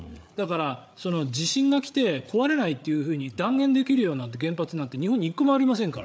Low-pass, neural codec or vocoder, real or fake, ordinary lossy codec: none; codec, 16 kHz, 8 kbps, FreqCodec, larger model; fake; none